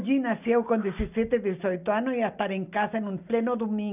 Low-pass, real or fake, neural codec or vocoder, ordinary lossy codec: 3.6 kHz; real; none; none